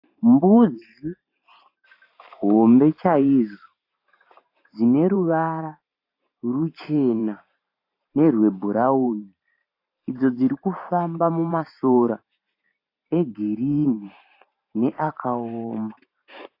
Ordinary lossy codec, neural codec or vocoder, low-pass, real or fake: AAC, 32 kbps; vocoder, 44.1 kHz, 128 mel bands every 512 samples, BigVGAN v2; 5.4 kHz; fake